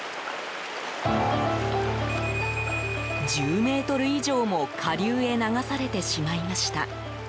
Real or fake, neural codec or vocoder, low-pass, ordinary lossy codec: real; none; none; none